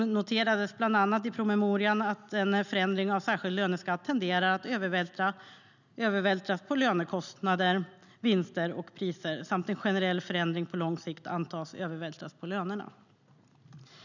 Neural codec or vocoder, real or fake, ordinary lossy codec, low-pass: none; real; none; 7.2 kHz